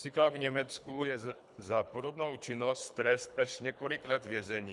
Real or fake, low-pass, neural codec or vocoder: fake; 10.8 kHz; codec, 24 kHz, 3 kbps, HILCodec